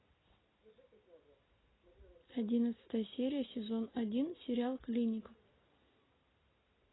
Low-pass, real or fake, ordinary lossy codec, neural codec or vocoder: 7.2 kHz; real; AAC, 16 kbps; none